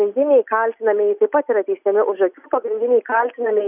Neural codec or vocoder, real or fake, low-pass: none; real; 3.6 kHz